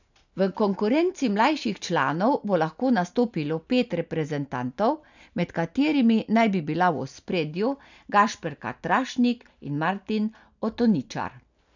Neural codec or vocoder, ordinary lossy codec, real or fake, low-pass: none; none; real; 7.2 kHz